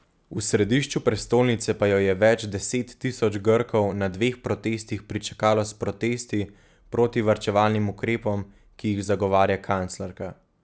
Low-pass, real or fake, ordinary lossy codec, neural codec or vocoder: none; real; none; none